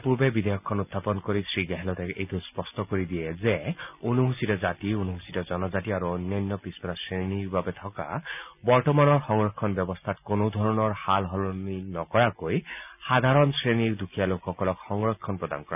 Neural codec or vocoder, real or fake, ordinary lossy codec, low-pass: none; real; none; 3.6 kHz